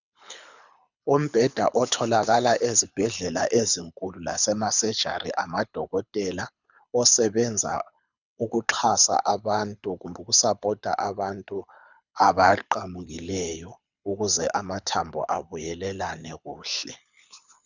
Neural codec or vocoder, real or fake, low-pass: codec, 24 kHz, 6 kbps, HILCodec; fake; 7.2 kHz